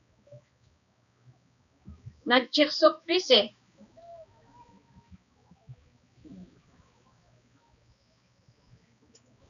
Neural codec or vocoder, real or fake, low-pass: codec, 16 kHz, 2 kbps, X-Codec, HuBERT features, trained on balanced general audio; fake; 7.2 kHz